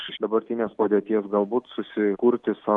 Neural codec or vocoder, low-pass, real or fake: none; 10.8 kHz; real